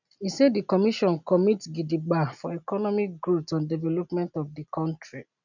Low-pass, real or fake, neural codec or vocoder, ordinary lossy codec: 7.2 kHz; real; none; none